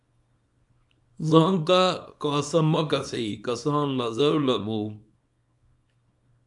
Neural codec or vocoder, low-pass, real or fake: codec, 24 kHz, 0.9 kbps, WavTokenizer, small release; 10.8 kHz; fake